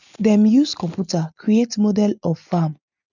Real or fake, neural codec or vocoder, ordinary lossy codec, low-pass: real; none; none; 7.2 kHz